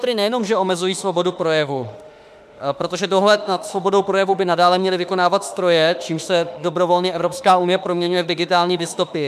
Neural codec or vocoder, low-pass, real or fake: autoencoder, 48 kHz, 32 numbers a frame, DAC-VAE, trained on Japanese speech; 14.4 kHz; fake